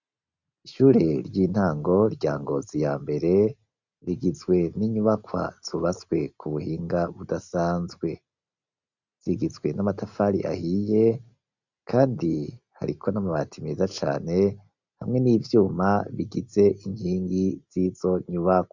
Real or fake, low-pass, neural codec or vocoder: real; 7.2 kHz; none